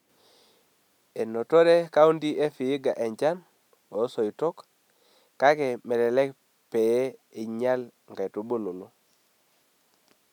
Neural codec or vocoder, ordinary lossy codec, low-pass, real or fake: none; none; 19.8 kHz; real